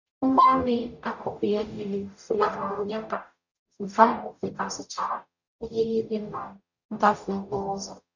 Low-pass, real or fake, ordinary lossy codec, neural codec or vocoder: 7.2 kHz; fake; none; codec, 44.1 kHz, 0.9 kbps, DAC